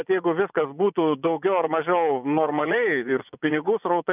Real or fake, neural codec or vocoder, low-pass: fake; vocoder, 24 kHz, 100 mel bands, Vocos; 3.6 kHz